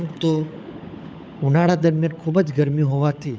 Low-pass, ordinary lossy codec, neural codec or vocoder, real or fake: none; none; codec, 16 kHz, 16 kbps, FunCodec, trained on LibriTTS, 50 frames a second; fake